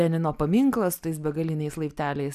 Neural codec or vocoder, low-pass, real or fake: none; 14.4 kHz; real